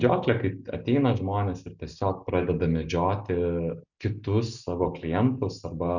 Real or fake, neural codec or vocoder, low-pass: real; none; 7.2 kHz